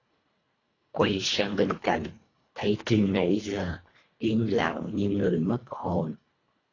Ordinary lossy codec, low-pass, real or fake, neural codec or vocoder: AAC, 32 kbps; 7.2 kHz; fake; codec, 24 kHz, 1.5 kbps, HILCodec